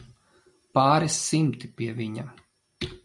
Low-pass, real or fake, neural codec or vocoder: 10.8 kHz; real; none